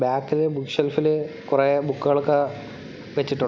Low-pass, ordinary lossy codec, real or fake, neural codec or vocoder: none; none; real; none